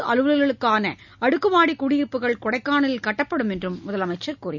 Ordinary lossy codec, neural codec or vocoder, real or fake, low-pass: none; none; real; 7.2 kHz